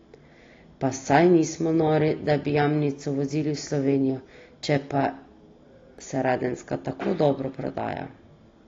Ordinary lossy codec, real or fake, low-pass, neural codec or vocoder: AAC, 32 kbps; real; 7.2 kHz; none